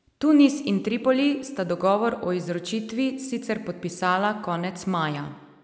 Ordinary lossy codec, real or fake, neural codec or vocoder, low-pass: none; real; none; none